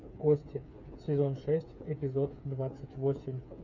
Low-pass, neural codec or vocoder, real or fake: 7.2 kHz; codec, 16 kHz, 8 kbps, FreqCodec, smaller model; fake